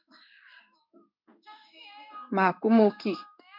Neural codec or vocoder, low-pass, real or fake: codec, 16 kHz in and 24 kHz out, 1 kbps, XY-Tokenizer; 5.4 kHz; fake